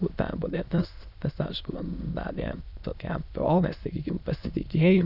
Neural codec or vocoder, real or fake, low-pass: autoencoder, 22.05 kHz, a latent of 192 numbers a frame, VITS, trained on many speakers; fake; 5.4 kHz